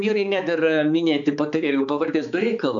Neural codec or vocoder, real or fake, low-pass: codec, 16 kHz, 4 kbps, X-Codec, HuBERT features, trained on balanced general audio; fake; 7.2 kHz